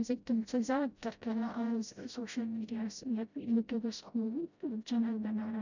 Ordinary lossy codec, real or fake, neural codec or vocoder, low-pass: none; fake; codec, 16 kHz, 0.5 kbps, FreqCodec, smaller model; 7.2 kHz